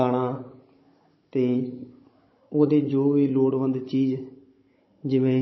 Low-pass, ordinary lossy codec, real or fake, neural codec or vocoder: 7.2 kHz; MP3, 24 kbps; fake; codec, 16 kHz, 16 kbps, FunCodec, trained on Chinese and English, 50 frames a second